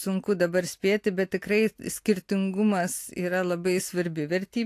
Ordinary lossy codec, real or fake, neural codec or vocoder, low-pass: AAC, 64 kbps; real; none; 14.4 kHz